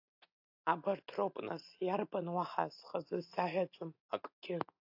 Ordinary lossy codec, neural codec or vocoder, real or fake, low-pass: AAC, 48 kbps; none; real; 5.4 kHz